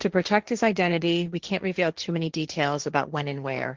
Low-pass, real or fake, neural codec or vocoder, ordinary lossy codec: 7.2 kHz; fake; codec, 16 kHz, 1.1 kbps, Voila-Tokenizer; Opus, 16 kbps